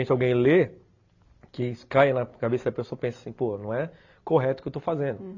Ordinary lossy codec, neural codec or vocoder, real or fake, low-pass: AAC, 48 kbps; none; real; 7.2 kHz